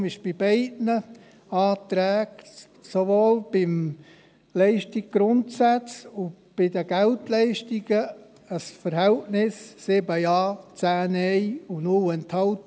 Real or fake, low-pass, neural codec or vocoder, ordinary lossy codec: real; none; none; none